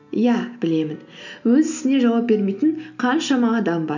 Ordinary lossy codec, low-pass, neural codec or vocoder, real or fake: none; 7.2 kHz; none; real